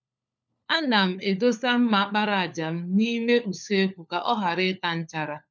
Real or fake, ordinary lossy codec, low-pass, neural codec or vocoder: fake; none; none; codec, 16 kHz, 4 kbps, FunCodec, trained on LibriTTS, 50 frames a second